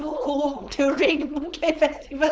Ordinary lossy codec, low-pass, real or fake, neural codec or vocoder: none; none; fake; codec, 16 kHz, 4.8 kbps, FACodec